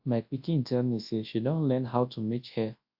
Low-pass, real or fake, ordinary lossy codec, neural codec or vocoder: 5.4 kHz; fake; AAC, 48 kbps; codec, 24 kHz, 0.9 kbps, WavTokenizer, large speech release